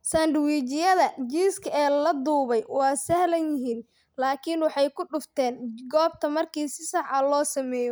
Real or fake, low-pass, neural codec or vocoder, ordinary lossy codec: real; none; none; none